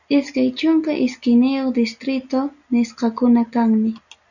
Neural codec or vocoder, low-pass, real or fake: none; 7.2 kHz; real